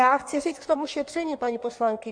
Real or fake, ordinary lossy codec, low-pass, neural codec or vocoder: fake; Opus, 64 kbps; 9.9 kHz; codec, 16 kHz in and 24 kHz out, 1.1 kbps, FireRedTTS-2 codec